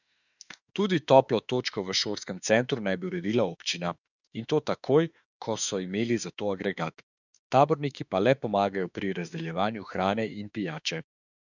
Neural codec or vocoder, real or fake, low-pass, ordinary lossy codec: autoencoder, 48 kHz, 32 numbers a frame, DAC-VAE, trained on Japanese speech; fake; 7.2 kHz; none